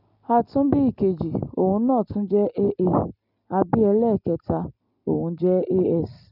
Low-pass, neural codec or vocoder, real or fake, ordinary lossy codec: 5.4 kHz; none; real; none